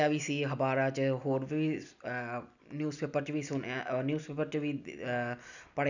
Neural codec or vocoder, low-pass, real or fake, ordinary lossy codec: none; 7.2 kHz; real; none